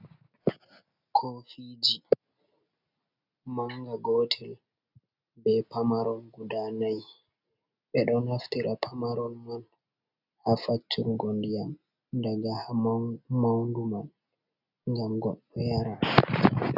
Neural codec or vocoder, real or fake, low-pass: none; real; 5.4 kHz